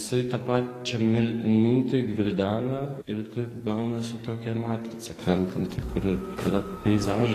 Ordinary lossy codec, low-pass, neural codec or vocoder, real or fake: AAC, 48 kbps; 14.4 kHz; codec, 44.1 kHz, 2.6 kbps, SNAC; fake